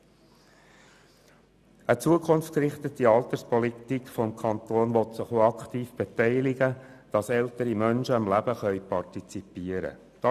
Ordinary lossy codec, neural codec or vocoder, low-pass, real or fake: none; none; 14.4 kHz; real